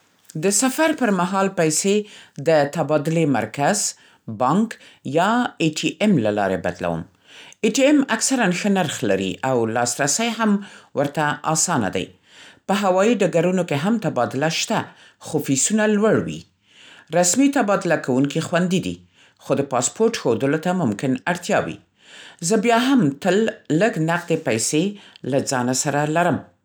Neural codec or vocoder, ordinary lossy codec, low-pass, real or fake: none; none; none; real